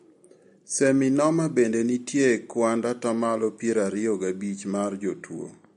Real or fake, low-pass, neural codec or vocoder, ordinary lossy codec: real; 19.8 kHz; none; MP3, 48 kbps